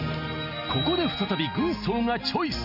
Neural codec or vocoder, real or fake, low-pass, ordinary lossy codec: none; real; 5.4 kHz; none